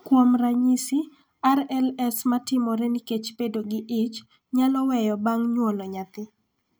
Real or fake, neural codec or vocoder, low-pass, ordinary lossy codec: real; none; none; none